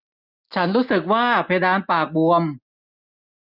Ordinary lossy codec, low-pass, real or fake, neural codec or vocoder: none; 5.4 kHz; real; none